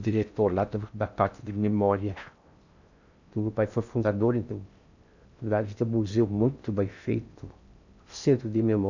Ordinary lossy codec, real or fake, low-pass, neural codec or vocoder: none; fake; 7.2 kHz; codec, 16 kHz in and 24 kHz out, 0.6 kbps, FocalCodec, streaming, 4096 codes